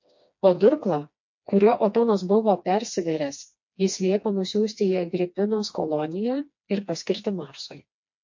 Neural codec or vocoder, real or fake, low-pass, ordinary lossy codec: codec, 16 kHz, 2 kbps, FreqCodec, smaller model; fake; 7.2 kHz; MP3, 48 kbps